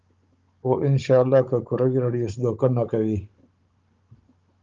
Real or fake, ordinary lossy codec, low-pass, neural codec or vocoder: fake; Opus, 32 kbps; 7.2 kHz; codec, 16 kHz, 16 kbps, FunCodec, trained on Chinese and English, 50 frames a second